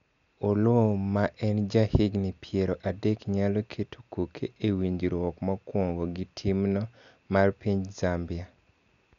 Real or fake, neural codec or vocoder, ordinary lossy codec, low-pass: real; none; none; 7.2 kHz